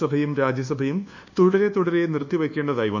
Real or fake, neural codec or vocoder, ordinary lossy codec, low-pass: fake; codec, 24 kHz, 1.2 kbps, DualCodec; none; 7.2 kHz